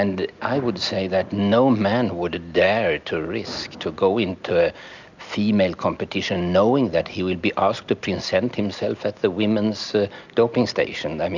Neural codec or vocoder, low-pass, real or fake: none; 7.2 kHz; real